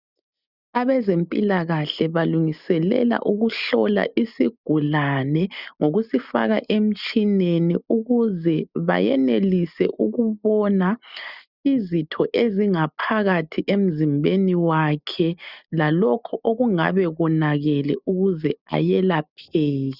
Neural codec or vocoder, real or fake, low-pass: none; real; 5.4 kHz